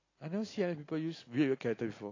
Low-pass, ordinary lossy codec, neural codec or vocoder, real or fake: 7.2 kHz; AAC, 32 kbps; none; real